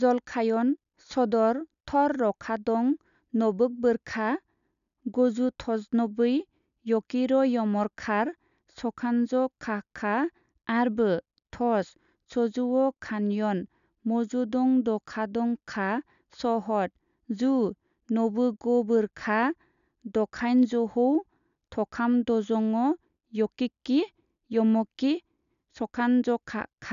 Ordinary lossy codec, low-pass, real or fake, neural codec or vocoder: none; 7.2 kHz; real; none